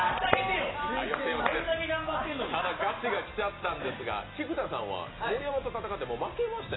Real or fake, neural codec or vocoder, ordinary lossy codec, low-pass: real; none; AAC, 16 kbps; 7.2 kHz